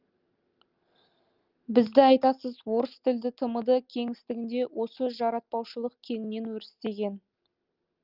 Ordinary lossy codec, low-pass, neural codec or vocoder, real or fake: Opus, 32 kbps; 5.4 kHz; none; real